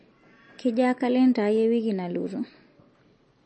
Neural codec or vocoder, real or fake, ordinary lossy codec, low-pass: none; real; MP3, 32 kbps; 10.8 kHz